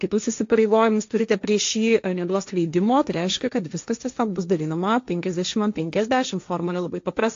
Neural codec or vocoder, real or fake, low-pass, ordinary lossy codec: codec, 16 kHz, 1.1 kbps, Voila-Tokenizer; fake; 7.2 kHz; AAC, 48 kbps